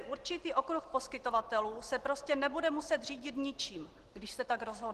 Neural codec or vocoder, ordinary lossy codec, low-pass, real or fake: none; Opus, 16 kbps; 14.4 kHz; real